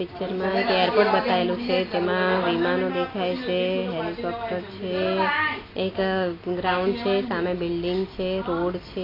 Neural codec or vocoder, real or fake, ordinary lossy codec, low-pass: none; real; AAC, 24 kbps; 5.4 kHz